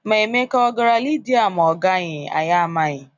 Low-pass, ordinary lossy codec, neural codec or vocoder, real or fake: 7.2 kHz; none; none; real